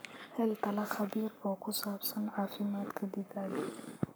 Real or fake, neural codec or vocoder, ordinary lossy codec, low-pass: fake; codec, 44.1 kHz, 7.8 kbps, Pupu-Codec; none; none